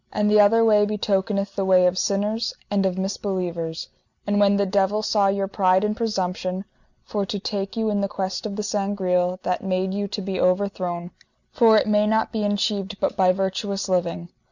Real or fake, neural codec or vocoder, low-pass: real; none; 7.2 kHz